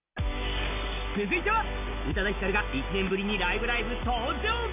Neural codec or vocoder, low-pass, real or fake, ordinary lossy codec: none; 3.6 kHz; real; none